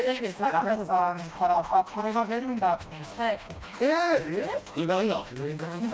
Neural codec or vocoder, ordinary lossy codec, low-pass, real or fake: codec, 16 kHz, 1 kbps, FreqCodec, smaller model; none; none; fake